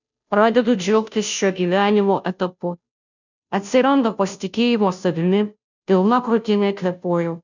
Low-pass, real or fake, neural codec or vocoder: 7.2 kHz; fake; codec, 16 kHz, 0.5 kbps, FunCodec, trained on Chinese and English, 25 frames a second